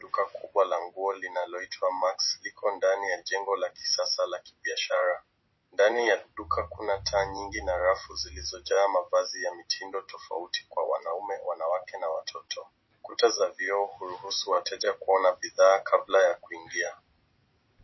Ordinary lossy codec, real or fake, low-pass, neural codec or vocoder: MP3, 24 kbps; real; 7.2 kHz; none